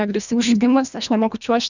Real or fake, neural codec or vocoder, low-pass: fake; codec, 24 kHz, 1.5 kbps, HILCodec; 7.2 kHz